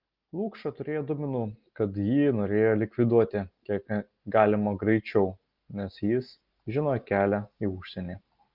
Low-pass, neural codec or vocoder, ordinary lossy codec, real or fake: 5.4 kHz; none; Opus, 32 kbps; real